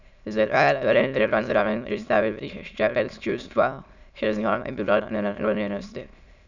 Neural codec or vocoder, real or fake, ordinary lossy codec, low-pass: autoencoder, 22.05 kHz, a latent of 192 numbers a frame, VITS, trained on many speakers; fake; none; 7.2 kHz